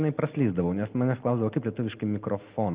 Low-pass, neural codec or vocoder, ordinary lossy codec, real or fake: 3.6 kHz; none; Opus, 32 kbps; real